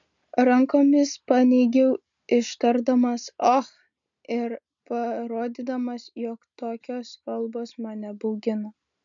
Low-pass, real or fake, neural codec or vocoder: 7.2 kHz; real; none